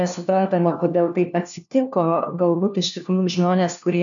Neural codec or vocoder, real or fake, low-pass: codec, 16 kHz, 1 kbps, FunCodec, trained on LibriTTS, 50 frames a second; fake; 7.2 kHz